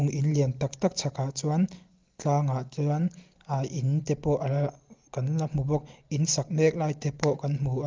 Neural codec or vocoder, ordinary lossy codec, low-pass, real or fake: none; Opus, 32 kbps; 7.2 kHz; real